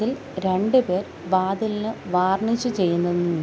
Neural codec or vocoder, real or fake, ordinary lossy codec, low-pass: none; real; none; none